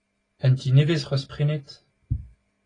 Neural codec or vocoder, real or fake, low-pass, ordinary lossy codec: none; real; 9.9 kHz; AAC, 32 kbps